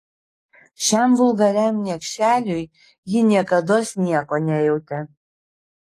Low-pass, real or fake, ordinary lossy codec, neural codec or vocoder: 14.4 kHz; fake; AAC, 48 kbps; codec, 44.1 kHz, 7.8 kbps, DAC